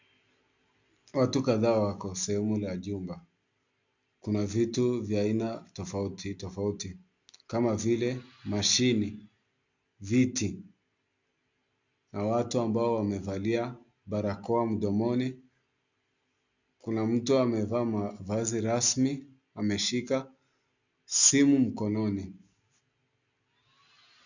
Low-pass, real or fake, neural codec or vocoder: 7.2 kHz; real; none